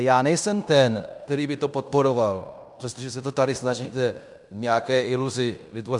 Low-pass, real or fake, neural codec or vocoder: 10.8 kHz; fake; codec, 16 kHz in and 24 kHz out, 0.9 kbps, LongCat-Audio-Codec, fine tuned four codebook decoder